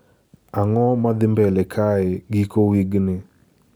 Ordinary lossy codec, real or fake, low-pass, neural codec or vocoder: none; real; none; none